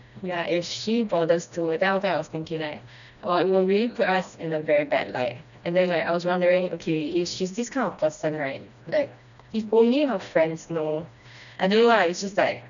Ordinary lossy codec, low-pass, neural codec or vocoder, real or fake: none; 7.2 kHz; codec, 16 kHz, 1 kbps, FreqCodec, smaller model; fake